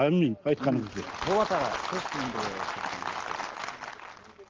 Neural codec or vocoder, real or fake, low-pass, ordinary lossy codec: none; real; 7.2 kHz; Opus, 16 kbps